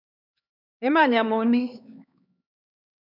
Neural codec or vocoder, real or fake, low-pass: codec, 16 kHz, 4 kbps, X-Codec, HuBERT features, trained on LibriSpeech; fake; 5.4 kHz